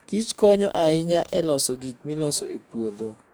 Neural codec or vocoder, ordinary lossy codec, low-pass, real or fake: codec, 44.1 kHz, 2.6 kbps, DAC; none; none; fake